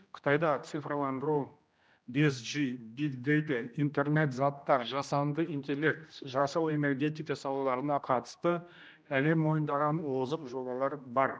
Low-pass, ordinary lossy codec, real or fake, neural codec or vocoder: none; none; fake; codec, 16 kHz, 1 kbps, X-Codec, HuBERT features, trained on general audio